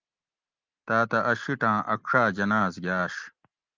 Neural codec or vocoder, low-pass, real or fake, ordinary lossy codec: none; 7.2 kHz; real; Opus, 24 kbps